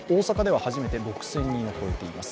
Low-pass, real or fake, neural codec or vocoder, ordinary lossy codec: none; real; none; none